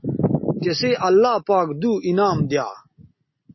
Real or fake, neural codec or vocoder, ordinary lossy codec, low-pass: real; none; MP3, 24 kbps; 7.2 kHz